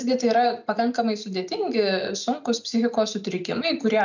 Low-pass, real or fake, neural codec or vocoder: 7.2 kHz; real; none